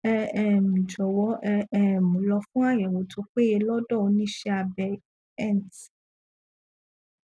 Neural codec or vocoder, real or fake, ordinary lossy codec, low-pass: none; real; none; none